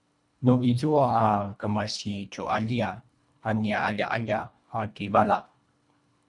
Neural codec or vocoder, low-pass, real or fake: codec, 24 kHz, 1.5 kbps, HILCodec; 10.8 kHz; fake